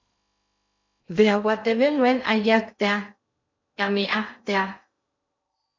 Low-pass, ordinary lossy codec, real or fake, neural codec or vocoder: 7.2 kHz; MP3, 48 kbps; fake; codec, 16 kHz in and 24 kHz out, 0.6 kbps, FocalCodec, streaming, 2048 codes